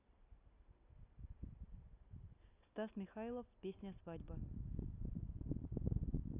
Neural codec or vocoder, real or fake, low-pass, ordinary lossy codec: none; real; 3.6 kHz; AAC, 24 kbps